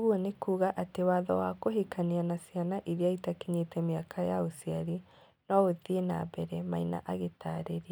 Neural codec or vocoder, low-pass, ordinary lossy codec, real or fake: none; none; none; real